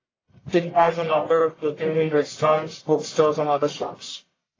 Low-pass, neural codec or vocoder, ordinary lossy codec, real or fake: 7.2 kHz; codec, 44.1 kHz, 1.7 kbps, Pupu-Codec; AAC, 32 kbps; fake